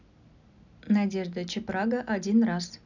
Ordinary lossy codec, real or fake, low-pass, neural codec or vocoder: none; real; 7.2 kHz; none